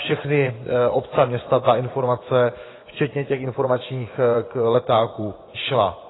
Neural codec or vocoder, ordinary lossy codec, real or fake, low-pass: vocoder, 24 kHz, 100 mel bands, Vocos; AAC, 16 kbps; fake; 7.2 kHz